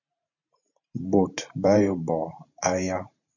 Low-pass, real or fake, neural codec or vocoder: 7.2 kHz; fake; vocoder, 44.1 kHz, 128 mel bands every 512 samples, BigVGAN v2